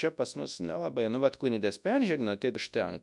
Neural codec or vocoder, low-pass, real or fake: codec, 24 kHz, 0.9 kbps, WavTokenizer, large speech release; 10.8 kHz; fake